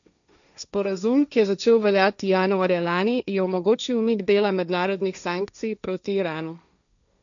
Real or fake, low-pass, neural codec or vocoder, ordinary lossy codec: fake; 7.2 kHz; codec, 16 kHz, 1.1 kbps, Voila-Tokenizer; none